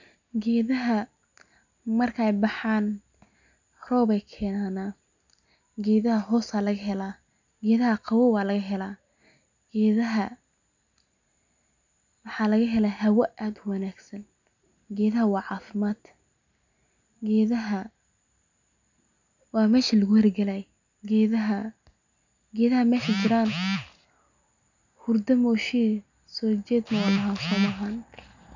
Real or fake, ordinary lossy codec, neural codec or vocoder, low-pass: real; none; none; 7.2 kHz